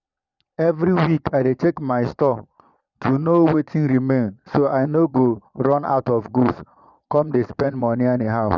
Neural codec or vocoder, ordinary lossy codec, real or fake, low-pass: vocoder, 22.05 kHz, 80 mel bands, Vocos; none; fake; 7.2 kHz